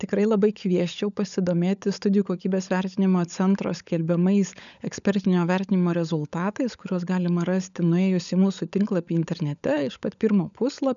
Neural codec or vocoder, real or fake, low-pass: codec, 16 kHz, 16 kbps, FunCodec, trained on LibriTTS, 50 frames a second; fake; 7.2 kHz